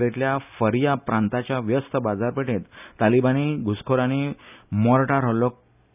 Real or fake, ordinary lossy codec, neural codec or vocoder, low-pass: real; none; none; 3.6 kHz